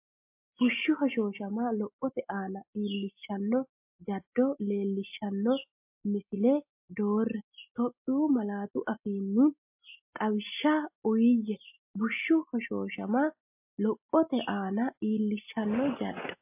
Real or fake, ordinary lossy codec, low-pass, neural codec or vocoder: real; MP3, 32 kbps; 3.6 kHz; none